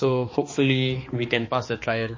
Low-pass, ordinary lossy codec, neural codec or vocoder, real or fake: 7.2 kHz; MP3, 32 kbps; codec, 16 kHz, 2 kbps, X-Codec, HuBERT features, trained on balanced general audio; fake